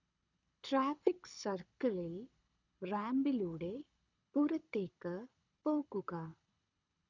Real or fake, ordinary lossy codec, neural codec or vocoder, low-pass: fake; none; codec, 24 kHz, 6 kbps, HILCodec; 7.2 kHz